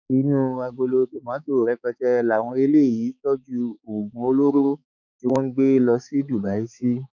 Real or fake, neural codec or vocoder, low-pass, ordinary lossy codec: fake; codec, 16 kHz, 4 kbps, X-Codec, HuBERT features, trained on balanced general audio; 7.2 kHz; none